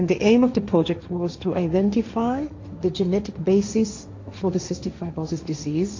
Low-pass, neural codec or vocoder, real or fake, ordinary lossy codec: 7.2 kHz; codec, 16 kHz, 1.1 kbps, Voila-Tokenizer; fake; AAC, 48 kbps